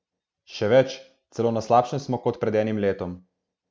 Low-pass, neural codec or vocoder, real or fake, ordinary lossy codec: none; none; real; none